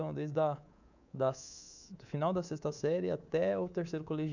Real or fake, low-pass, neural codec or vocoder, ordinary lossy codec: fake; 7.2 kHz; codec, 24 kHz, 3.1 kbps, DualCodec; none